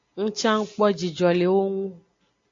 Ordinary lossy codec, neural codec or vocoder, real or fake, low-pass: AAC, 48 kbps; none; real; 7.2 kHz